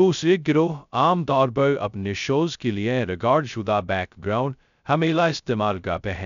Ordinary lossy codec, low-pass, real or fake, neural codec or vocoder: none; 7.2 kHz; fake; codec, 16 kHz, 0.2 kbps, FocalCodec